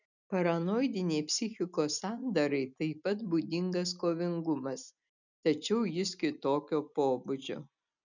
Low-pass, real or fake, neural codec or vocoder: 7.2 kHz; real; none